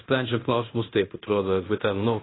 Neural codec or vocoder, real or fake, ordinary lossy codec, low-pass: codec, 16 kHz in and 24 kHz out, 0.9 kbps, LongCat-Audio-Codec, fine tuned four codebook decoder; fake; AAC, 16 kbps; 7.2 kHz